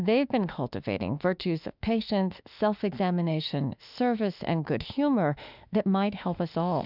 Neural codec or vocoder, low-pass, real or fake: autoencoder, 48 kHz, 32 numbers a frame, DAC-VAE, trained on Japanese speech; 5.4 kHz; fake